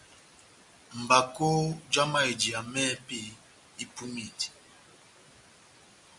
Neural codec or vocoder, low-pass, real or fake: none; 10.8 kHz; real